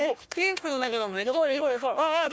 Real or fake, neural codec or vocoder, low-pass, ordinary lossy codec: fake; codec, 16 kHz, 1 kbps, FunCodec, trained on Chinese and English, 50 frames a second; none; none